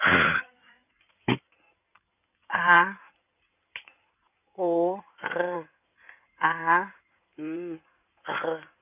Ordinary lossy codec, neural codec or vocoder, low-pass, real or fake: none; codec, 16 kHz in and 24 kHz out, 2.2 kbps, FireRedTTS-2 codec; 3.6 kHz; fake